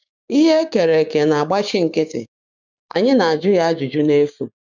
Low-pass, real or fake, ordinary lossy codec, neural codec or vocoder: 7.2 kHz; fake; none; codec, 16 kHz, 6 kbps, DAC